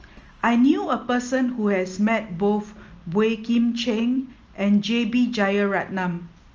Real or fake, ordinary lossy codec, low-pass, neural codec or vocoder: real; Opus, 24 kbps; 7.2 kHz; none